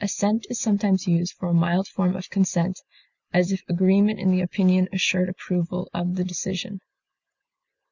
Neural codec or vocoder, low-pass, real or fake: none; 7.2 kHz; real